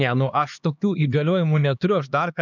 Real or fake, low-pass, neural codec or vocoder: fake; 7.2 kHz; codec, 16 kHz, 2 kbps, X-Codec, HuBERT features, trained on LibriSpeech